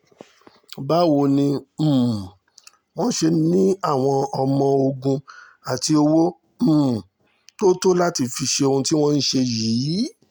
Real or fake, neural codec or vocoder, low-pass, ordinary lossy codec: real; none; none; none